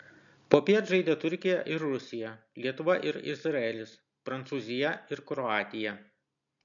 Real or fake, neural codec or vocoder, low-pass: real; none; 7.2 kHz